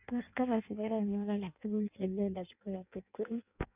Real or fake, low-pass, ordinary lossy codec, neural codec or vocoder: fake; 3.6 kHz; none; codec, 16 kHz in and 24 kHz out, 0.6 kbps, FireRedTTS-2 codec